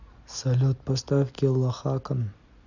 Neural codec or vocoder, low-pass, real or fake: none; 7.2 kHz; real